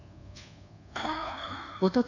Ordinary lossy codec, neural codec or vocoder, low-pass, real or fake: none; codec, 24 kHz, 1.2 kbps, DualCodec; 7.2 kHz; fake